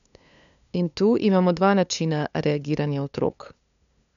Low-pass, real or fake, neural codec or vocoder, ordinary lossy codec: 7.2 kHz; fake; codec, 16 kHz, 2 kbps, FunCodec, trained on LibriTTS, 25 frames a second; none